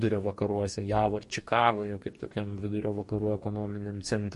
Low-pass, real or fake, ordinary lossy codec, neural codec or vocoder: 14.4 kHz; fake; MP3, 48 kbps; codec, 44.1 kHz, 2.6 kbps, DAC